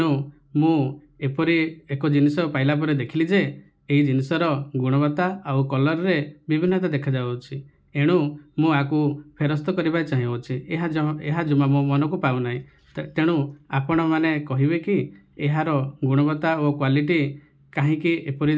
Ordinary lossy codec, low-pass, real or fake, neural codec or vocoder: none; none; real; none